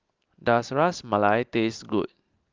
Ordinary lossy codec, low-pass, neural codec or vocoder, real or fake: Opus, 32 kbps; 7.2 kHz; none; real